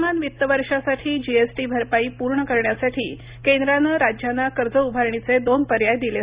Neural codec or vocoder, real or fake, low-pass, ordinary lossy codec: none; real; 3.6 kHz; Opus, 64 kbps